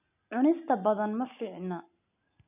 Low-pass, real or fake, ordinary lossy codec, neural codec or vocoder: 3.6 kHz; real; none; none